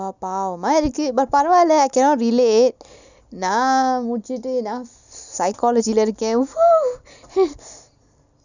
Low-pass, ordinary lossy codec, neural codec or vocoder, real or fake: 7.2 kHz; none; none; real